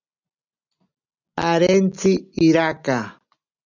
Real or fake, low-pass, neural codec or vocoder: real; 7.2 kHz; none